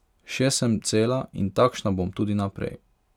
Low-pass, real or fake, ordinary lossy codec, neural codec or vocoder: 19.8 kHz; real; none; none